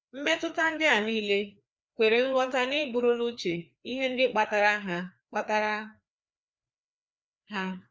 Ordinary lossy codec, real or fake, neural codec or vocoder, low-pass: none; fake; codec, 16 kHz, 2 kbps, FreqCodec, larger model; none